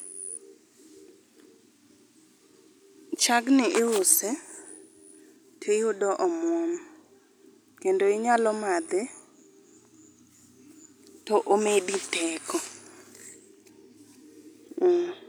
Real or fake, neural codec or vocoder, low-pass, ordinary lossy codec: real; none; none; none